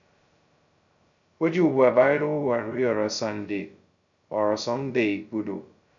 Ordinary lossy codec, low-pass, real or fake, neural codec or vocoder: none; 7.2 kHz; fake; codec, 16 kHz, 0.2 kbps, FocalCodec